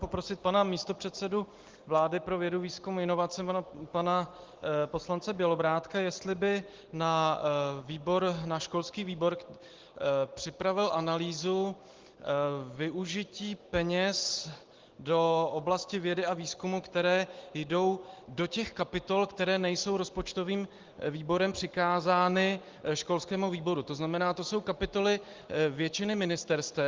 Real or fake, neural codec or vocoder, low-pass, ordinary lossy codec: real; none; 7.2 kHz; Opus, 16 kbps